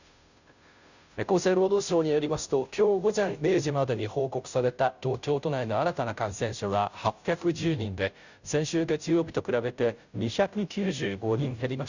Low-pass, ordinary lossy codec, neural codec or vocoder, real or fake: 7.2 kHz; none; codec, 16 kHz, 0.5 kbps, FunCodec, trained on Chinese and English, 25 frames a second; fake